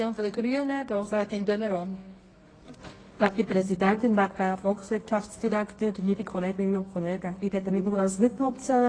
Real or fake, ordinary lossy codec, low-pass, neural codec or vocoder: fake; AAC, 32 kbps; 9.9 kHz; codec, 24 kHz, 0.9 kbps, WavTokenizer, medium music audio release